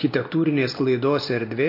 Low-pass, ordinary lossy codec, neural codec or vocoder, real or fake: 5.4 kHz; MP3, 32 kbps; none; real